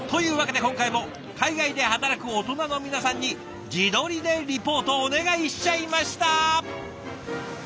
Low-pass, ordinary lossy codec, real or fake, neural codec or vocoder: none; none; real; none